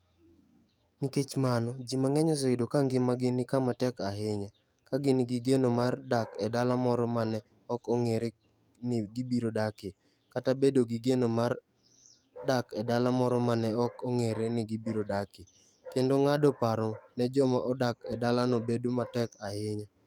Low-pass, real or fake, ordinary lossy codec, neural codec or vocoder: 19.8 kHz; fake; none; codec, 44.1 kHz, 7.8 kbps, DAC